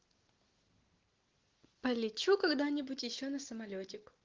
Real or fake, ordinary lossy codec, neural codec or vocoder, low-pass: real; Opus, 16 kbps; none; 7.2 kHz